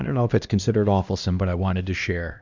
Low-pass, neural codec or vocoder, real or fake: 7.2 kHz; codec, 16 kHz, 1 kbps, X-Codec, HuBERT features, trained on LibriSpeech; fake